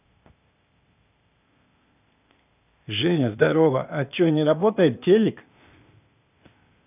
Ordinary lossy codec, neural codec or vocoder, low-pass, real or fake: none; codec, 16 kHz, 0.8 kbps, ZipCodec; 3.6 kHz; fake